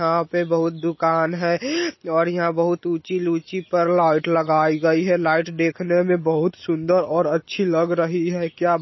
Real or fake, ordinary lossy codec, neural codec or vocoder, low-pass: real; MP3, 24 kbps; none; 7.2 kHz